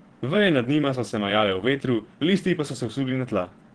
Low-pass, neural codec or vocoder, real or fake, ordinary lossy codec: 9.9 kHz; vocoder, 22.05 kHz, 80 mel bands, WaveNeXt; fake; Opus, 16 kbps